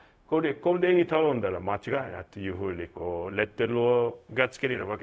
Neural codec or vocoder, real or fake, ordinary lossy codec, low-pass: codec, 16 kHz, 0.4 kbps, LongCat-Audio-Codec; fake; none; none